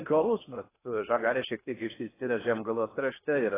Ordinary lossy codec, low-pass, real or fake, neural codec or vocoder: AAC, 16 kbps; 3.6 kHz; fake; codec, 16 kHz in and 24 kHz out, 0.6 kbps, FocalCodec, streaming, 2048 codes